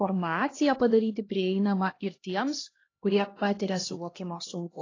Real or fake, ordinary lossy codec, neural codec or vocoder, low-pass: fake; AAC, 32 kbps; codec, 16 kHz, 1 kbps, X-Codec, HuBERT features, trained on LibriSpeech; 7.2 kHz